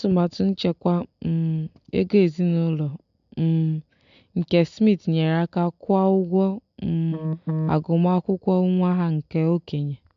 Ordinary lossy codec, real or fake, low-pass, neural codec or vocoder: MP3, 64 kbps; real; 7.2 kHz; none